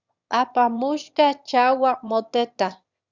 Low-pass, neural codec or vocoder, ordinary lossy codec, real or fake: 7.2 kHz; autoencoder, 22.05 kHz, a latent of 192 numbers a frame, VITS, trained on one speaker; Opus, 64 kbps; fake